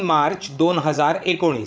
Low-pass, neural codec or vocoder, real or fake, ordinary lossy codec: none; codec, 16 kHz, 16 kbps, FreqCodec, larger model; fake; none